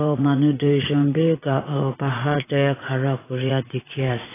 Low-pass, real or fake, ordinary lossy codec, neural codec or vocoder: 3.6 kHz; fake; AAC, 16 kbps; vocoder, 44.1 kHz, 80 mel bands, Vocos